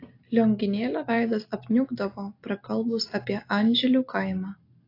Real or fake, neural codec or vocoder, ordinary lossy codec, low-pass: real; none; AAC, 32 kbps; 5.4 kHz